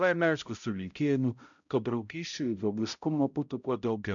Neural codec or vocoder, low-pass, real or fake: codec, 16 kHz, 0.5 kbps, X-Codec, HuBERT features, trained on balanced general audio; 7.2 kHz; fake